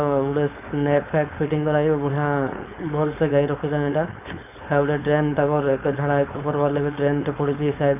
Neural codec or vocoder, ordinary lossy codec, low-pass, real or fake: codec, 16 kHz, 4.8 kbps, FACodec; none; 3.6 kHz; fake